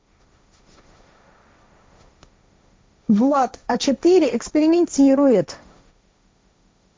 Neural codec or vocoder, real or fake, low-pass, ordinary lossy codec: codec, 16 kHz, 1.1 kbps, Voila-Tokenizer; fake; none; none